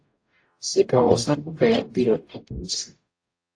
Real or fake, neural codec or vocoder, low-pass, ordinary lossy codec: fake; codec, 44.1 kHz, 0.9 kbps, DAC; 9.9 kHz; AAC, 48 kbps